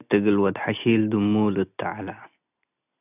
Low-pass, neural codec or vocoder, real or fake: 3.6 kHz; none; real